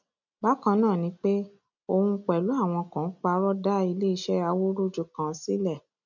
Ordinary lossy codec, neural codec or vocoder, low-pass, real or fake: none; none; 7.2 kHz; real